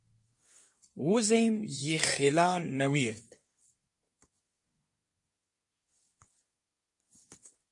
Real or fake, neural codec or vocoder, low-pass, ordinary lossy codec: fake; codec, 24 kHz, 1 kbps, SNAC; 10.8 kHz; MP3, 48 kbps